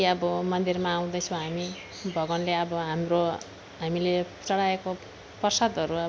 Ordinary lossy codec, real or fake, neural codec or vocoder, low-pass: none; real; none; none